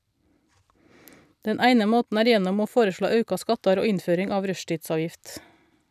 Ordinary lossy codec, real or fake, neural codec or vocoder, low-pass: none; fake; vocoder, 44.1 kHz, 128 mel bands every 256 samples, BigVGAN v2; 14.4 kHz